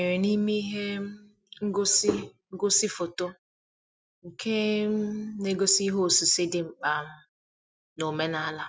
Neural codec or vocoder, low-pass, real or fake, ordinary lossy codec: none; none; real; none